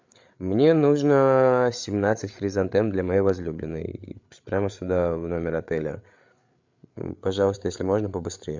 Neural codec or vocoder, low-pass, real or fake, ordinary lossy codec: codec, 16 kHz, 8 kbps, FreqCodec, larger model; 7.2 kHz; fake; MP3, 64 kbps